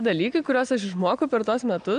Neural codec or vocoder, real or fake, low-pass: none; real; 9.9 kHz